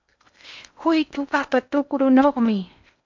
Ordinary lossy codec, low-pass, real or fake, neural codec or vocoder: MP3, 64 kbps; 7.2 kHz; fake; codec, 16 kHz in and 24 kHz out, 0.8 kbps, FocalCodec, streaming, 65536 codes